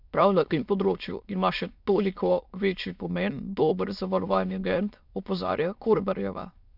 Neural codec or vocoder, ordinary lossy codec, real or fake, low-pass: autoencoder, 22.05 kHz, a latent of 192 numbers a frame, VITS, trained on many speakers; none; fake; 5.4 kHz